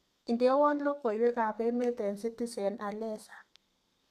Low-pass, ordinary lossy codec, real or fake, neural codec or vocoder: 14.4 kHz; none; fake; codec, 32 kHz, 1.9 kbps, SNAC